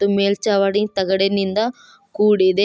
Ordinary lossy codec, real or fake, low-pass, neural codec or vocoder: none; real; none; none